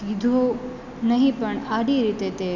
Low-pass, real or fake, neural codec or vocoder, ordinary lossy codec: 7.2 kHz; real; none; none